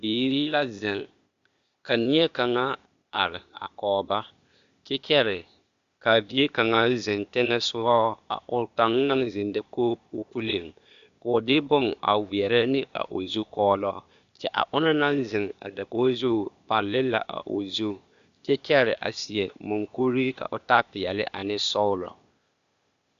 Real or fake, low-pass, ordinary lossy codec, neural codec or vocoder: fake; 7.2 kHz; Opus, 64 kbps; codec, 16 kHz, 0.8 kbps, ZipCodec